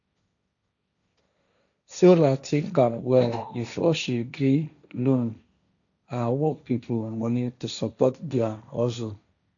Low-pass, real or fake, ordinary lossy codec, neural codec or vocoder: 7.2 kHz; fake; none; codec, 16 kHz, 1.1 kbps, Voila-Tokenizer